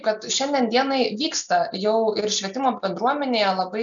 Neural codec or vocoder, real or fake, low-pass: none; real; 7.2 kHz